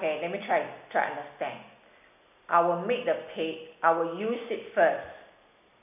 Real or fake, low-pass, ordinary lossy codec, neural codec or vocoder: real; 3.6 kHz; none; none